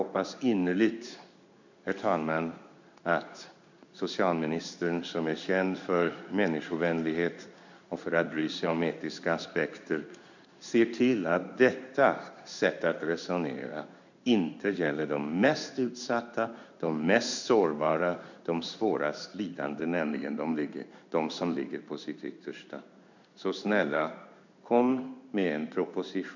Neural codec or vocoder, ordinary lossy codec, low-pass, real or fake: codec, 16 kHz in and 24 kHz out, 1 kbps, XY-Tokenizer; none; 7.2 kHz; fake